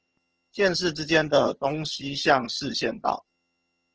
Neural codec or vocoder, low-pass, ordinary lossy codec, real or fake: vocoder, 22.05 kHz, 80 mel bands, HiFi-GAN; 7.2 kHz; Opus, 16 kbps; fake